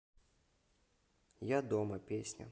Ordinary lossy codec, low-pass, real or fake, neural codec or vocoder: none; none; real; none